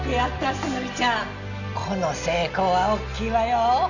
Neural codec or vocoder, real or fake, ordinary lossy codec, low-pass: none; real; none; 7.2 kHz